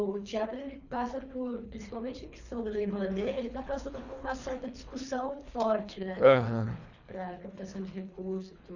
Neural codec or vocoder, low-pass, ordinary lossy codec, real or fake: codec, 24 kHz, 3 kbps, HILCodec; 7.2 kHz; none; fake